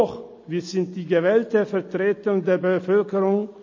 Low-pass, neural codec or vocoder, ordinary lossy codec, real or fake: 7.2 kHz; none; MP3, 32 kbps; real